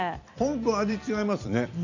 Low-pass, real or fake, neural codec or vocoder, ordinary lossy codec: 7.2 kHz; fake; vocoder, 44.1 kHz, 128 mel bands every 256 samples, BigVGAN v2; none